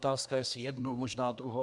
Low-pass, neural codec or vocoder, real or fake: 10.8 kHz; codec, 24 kHz, 1 kbps, SNAC; fake